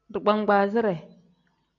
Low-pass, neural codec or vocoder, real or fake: 7.2 kHz; none; real